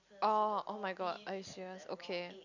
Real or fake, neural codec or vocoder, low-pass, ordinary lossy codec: real; none; 7.2 kHz; none